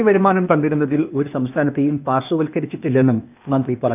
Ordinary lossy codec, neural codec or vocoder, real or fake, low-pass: none; codec, 16 kHz, 0.8 kbps, ZipCodec; fake; 3.6 kHz